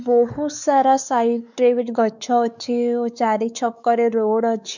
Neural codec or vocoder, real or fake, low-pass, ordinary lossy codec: codec, 16 kHz, 4 kbps, X-Codec, WavLM features, trained on Multilingual LibriSpeech; fake; 7.2 kHz; none